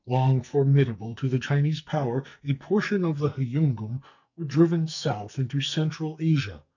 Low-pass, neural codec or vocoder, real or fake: 7.2 kHz; codec, 44.1 kHz, 2.6 kbps, SNAC; fake